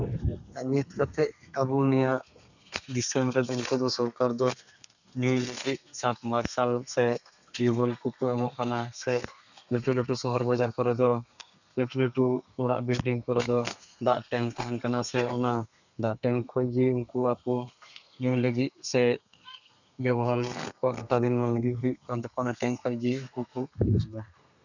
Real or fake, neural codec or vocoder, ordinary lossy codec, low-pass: fake; codec, 32 kHz, 1.9 kbps, SNAC; none; 7.2 kHz